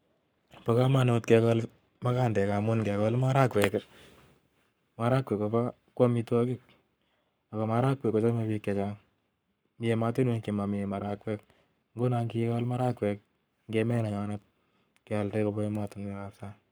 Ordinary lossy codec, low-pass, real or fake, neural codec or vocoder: none; none; fake; codec, 44.1 kHz, 7.8 kbps, Pupu-Codec